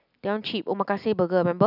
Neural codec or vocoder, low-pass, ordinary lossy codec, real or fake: none; 5.4 kHz; none; real